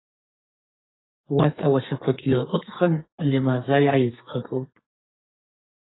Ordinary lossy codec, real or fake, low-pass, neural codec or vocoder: AAC, 16 kbps; fake; 7.2 kHz; codec, 32 kHz, 1.9 kbps, SNAC